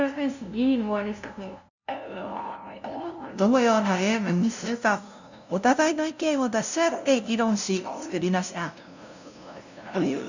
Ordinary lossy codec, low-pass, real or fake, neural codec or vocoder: none; 7.2 kHz; fake; codec, 16 kHz, 0.5 kbps, FunCodec, trained on LibriTTS, 25 frames a second